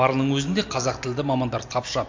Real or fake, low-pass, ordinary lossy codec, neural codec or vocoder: real; 7.2 kHz; MP3, 48 kbps; none